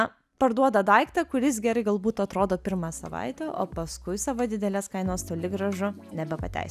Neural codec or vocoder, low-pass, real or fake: none; 14.4 kHz; real